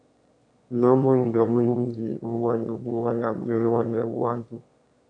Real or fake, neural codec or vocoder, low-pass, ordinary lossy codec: fake; autoencoder, 22.05 kHz, a latent of 192 numbers a frame, VITS, trained on one speaker; 9.9 kHz; MP3, 64 kbps